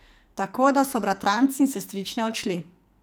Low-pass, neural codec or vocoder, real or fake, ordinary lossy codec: none; codec, 44.1 kHz, 2.6 kbps, SNAC; fake; none